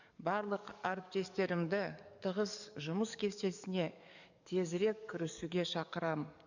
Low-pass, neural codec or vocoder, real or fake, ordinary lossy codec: 7.2 kHz; codec, 44.1 kHz, 7.8 kbps, DAC; fake; none